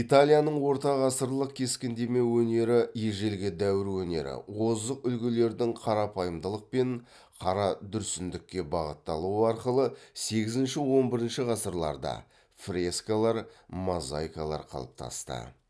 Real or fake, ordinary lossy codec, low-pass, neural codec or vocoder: real; none; none; none